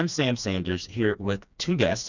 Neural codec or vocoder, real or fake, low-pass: codec, 16 kHz, 2 kbps, FreqCodec, smaller model; fake; 7.2 kHz